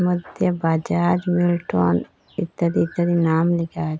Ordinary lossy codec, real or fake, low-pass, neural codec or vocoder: none; real; none; none